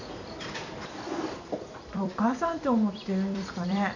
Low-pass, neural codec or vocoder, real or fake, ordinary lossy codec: 7.2 kHz; vocoder, 44.1 kHz, 128 mel bands every 256 samples, BigVGAN v2; fake; none